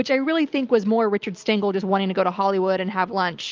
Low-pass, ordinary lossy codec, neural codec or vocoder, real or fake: 7.2 kHz; Opus, 32 kbps; none; real